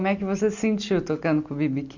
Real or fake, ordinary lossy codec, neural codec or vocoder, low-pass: real; none; none; 7.2 kHz